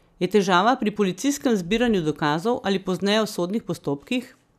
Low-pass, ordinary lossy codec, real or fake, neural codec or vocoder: 14.4 kHz; none; real; none